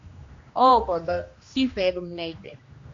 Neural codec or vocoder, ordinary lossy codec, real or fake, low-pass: codec, 16 kHz, 1 kbps, X-Codec, HuBERT features, trained on general audio; AAC, 48 kbps; fake; 7.2 kHz